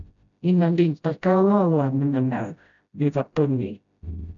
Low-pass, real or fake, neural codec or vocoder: 7.2 kHz; fake; codec, 16 kHz, 0.5 kbps, FreqCodec, smaller model